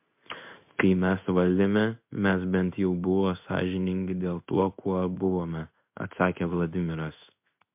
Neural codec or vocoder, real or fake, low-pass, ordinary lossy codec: codec, 16 kHz in and 24 kHz out, 1 kbps, XY-Tokenizer; fake; 3.6 kHz; MP3, 32 kbps